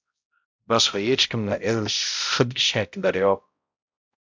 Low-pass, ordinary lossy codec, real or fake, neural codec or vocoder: 7.2 kHz; MP3, 64 kbps; fake; codec, 16 kHz, 0.5 kbps, X-Codec, HuBERT features, trained on balanced general audio